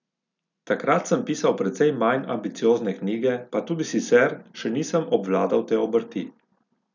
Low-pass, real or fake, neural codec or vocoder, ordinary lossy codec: 7.2 kHz; real; none; none